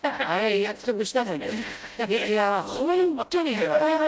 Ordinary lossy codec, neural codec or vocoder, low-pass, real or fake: none; codec, 16 kHz, 0.5 kbps, FreqCodec, smaller model; none; fake